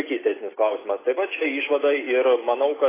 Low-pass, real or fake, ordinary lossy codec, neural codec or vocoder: 3.6 kHz; real; AAC, 16 kbps; none